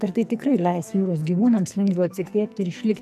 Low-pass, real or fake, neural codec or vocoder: 14.4 kHz; fake; codec, 44.1 kHz, 2.6 kbps, SNAC